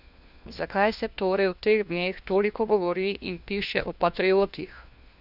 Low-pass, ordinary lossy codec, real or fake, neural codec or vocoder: 5.4 kHz; none; fake; codec, 16 kHz, 1 kbps, FunCodec, trained on LibriTTS, 50 frames a second